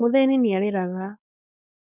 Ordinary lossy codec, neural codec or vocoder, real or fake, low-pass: none; codec, 16 kHz, 4.8 kbps, FACodec; fake; 3.6 kHz